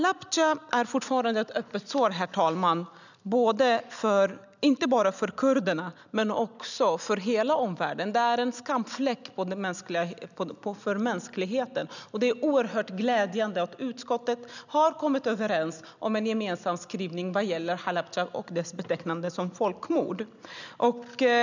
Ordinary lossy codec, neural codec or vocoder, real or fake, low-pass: none; none; real; 7.2 kHz